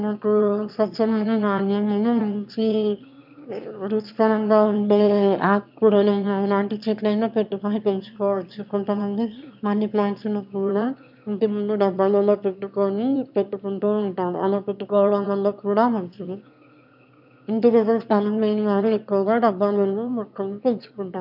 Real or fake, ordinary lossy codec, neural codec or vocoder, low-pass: fake; none; autoencoder, 22.05 kHz, a latent of 192 numbers a frame, VITS, trained on one speaker; 5.4 kHz